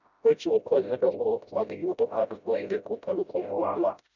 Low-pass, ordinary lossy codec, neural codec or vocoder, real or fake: 7.2 kHz; none; codec, 16 kHz, 0.5 kbps, FreqCodec, smaller model; fake